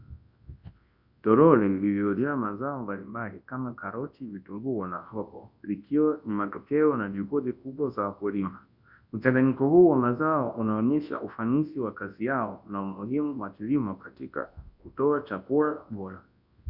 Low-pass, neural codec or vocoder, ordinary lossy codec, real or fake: 5.4 kHz; codec, 24 kHz, 0.9 kbps, WavTokenizer, large speech release; Opus, 64 kbps; fake